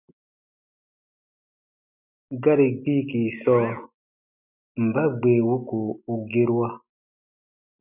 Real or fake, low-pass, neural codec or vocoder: real; 3.6 kHz; none